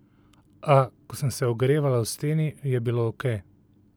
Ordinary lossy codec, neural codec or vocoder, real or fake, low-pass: none; none; real; none